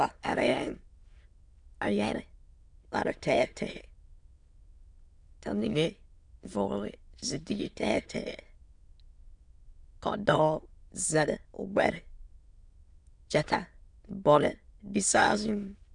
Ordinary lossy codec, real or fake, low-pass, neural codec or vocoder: Opus, 64 kbps; fake; 9.9 kHz; autoencoder, 22.05 kHz, a latent of 192 numbers a frame, VITS, trained on many speakers